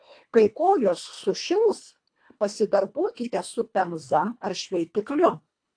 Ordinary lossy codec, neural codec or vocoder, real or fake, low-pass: AAC, 48 kbps; codec, 24 kHz, 1.5 kbps, HILCodec; fake; 9.9 kHz